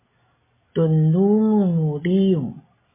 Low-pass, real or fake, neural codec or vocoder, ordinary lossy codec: 3.6 kHz; real; none; MP3, 16 kbps